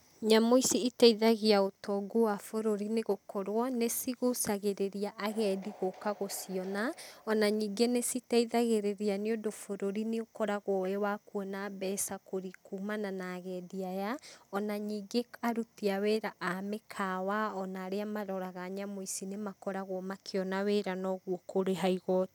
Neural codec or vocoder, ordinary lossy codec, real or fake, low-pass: none; none; real; none